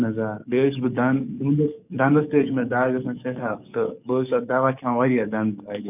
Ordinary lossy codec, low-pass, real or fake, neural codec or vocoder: none; 3.6 kHz; fake; codec, 44.1 kHz, 7.8 kbps, DAC